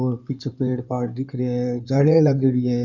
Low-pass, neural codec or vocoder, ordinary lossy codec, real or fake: 7.2 kHz; codec, 16 kHz in and 24 kHz out, 2.2 kbps, FireRedTTS-2 codec; none; fake